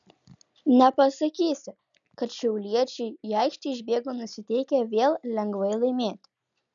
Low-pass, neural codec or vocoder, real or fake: 7.2 kHz; none; real